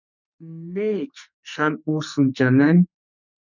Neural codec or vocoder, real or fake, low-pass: codec, 44.1 kHz, 2.6 kbps, SNAC; fake; 7.2 kHz